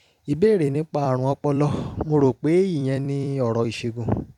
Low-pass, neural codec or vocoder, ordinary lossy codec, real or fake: 19.8 kHz; vocoder, 44.1 kHz, 128 mel bands every 256 samples, BigVGAN v2; none; fake